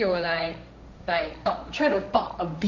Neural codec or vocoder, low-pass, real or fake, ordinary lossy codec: codec, 16 kHz, 1.1 kbps, Voila-Tokenizer; 7.2 kHz; fake; none